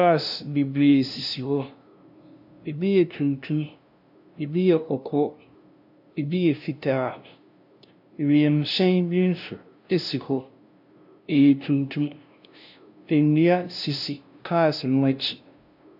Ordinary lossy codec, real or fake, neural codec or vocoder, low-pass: AAC, 48 kbps; fake; codec, 16 kHz, 0.5 kbps, FunCodec, trained on LibriTTS, 25 frames a second; 5.4 kHz